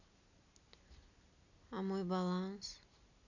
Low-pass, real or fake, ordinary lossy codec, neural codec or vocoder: 7.2 kHz; real; none; none